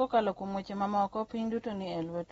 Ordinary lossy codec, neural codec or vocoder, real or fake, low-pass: AAC, 24 kbps; none; real; 19.8 kHz